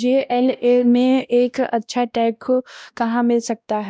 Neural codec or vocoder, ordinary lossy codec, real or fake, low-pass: codec, 16 kHz, 1 kbps, X-Codec, WavLM features, trained on Multilingual LibriSpeech; none; fake; none